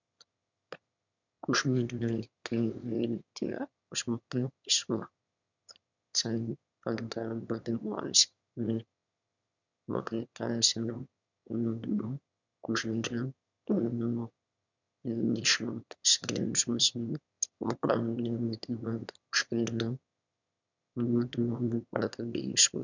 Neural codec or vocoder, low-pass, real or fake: autoencoder, 22.05 kHz, a latent of 192 numbers a frame, VITS, trained on one speaker; 7.2 kHz; fake